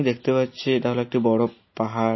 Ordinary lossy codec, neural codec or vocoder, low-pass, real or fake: MP3, 24 kbps; none; 7.2 kHz; real